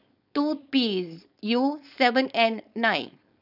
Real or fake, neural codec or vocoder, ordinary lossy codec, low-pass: fake; codec, 16 kHz, 4.8 kbps, FACodec; none; 5.4 kHz